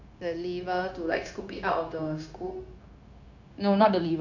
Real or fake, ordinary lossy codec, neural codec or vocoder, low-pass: fake; none; codec, 16 kHz, 0.9 kbps, LongCat-Audio-Codec; 7.2 kHz